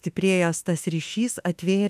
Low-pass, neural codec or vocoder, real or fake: 14.4 kHz; autoencoder, 48 kHz, 32 numbers a frame, DAC-VAE, trained on Japanese speech; fake